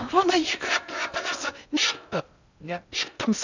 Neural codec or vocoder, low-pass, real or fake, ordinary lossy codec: codec, 16 kHz in and 24 kHz out, 0.6 kbps, FocalCodec, streaming, 2048 codes; 7.2 kHz; fake; none